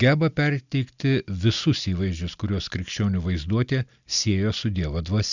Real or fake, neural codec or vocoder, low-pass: real; none; 7.2 kHz